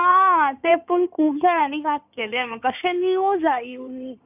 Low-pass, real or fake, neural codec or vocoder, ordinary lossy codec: 3.6 kHz; fake; codec, 16 kHz in and 24 kHz out, 2.2 kbps, FireRedTTS-2 codec; none